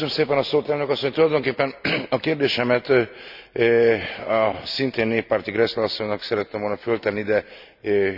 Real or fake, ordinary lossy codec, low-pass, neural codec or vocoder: real; none; 5.4 kHz; none